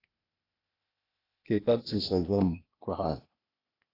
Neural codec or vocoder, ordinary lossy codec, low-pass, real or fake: codec, 16 kHz, 0.8 kbps, ZipCodec; AAC, 24 kbps; 5.4 kHz; fake